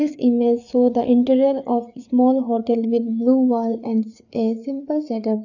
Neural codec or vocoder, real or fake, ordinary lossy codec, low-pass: codec, 16 kHz, 4 kbps, FreqCodec, larger model; fake; none; 7.2 kHz